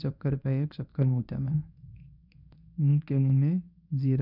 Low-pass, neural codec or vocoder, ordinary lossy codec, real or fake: 5.4 kHz; codec, 24 kHz, 0.9 kbps, WavTokenizer, medium speech release version 1; none; fake